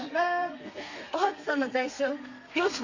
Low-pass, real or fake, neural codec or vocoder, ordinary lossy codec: 7.2 kHz; fake; codec, 32 kHz, 1.9 kbps, SNAC; Opus, 64 kbps